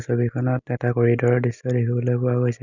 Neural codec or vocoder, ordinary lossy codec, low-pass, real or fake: none; none; 7.2 kHz; real